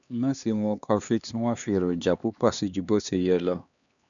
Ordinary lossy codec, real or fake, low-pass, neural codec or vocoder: none; fake; 7.2 kHz; codec, 16 kHz, 2 kbps, X-Codec, HuBERT features, trained on LibriSpeech